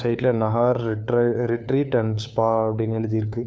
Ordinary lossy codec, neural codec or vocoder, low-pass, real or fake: none; codec, 16 kHz, 4 kbps, FunCodec, trained on LibriTTS, 50 frames a second; none; fake